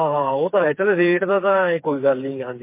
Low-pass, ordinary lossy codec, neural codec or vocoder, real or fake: 3.6 kHz; MP3, 24 kbps; codec, 16 kHz, 4 kbps, FreqCodec, smaller model; fake